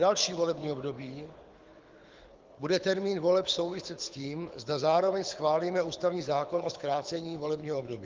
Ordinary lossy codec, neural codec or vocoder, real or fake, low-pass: Opus, 24 kbps; codec, 24 kHz, 6 kbps, HILCodec; fake; 7.2 kHz